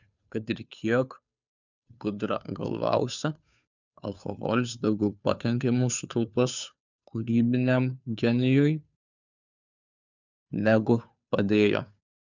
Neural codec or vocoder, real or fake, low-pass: codec, 16 kHz, 2 kbps, FunCodec, trained on Chinese and English, 25 frames a second; fake; 7.2 kHz